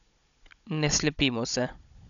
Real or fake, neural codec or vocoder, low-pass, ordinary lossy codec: fake; codec, 16 kHz, 16 kbps, FunCodec, trained on Chinese and English, 50 frames a second; 7.2 kHz; none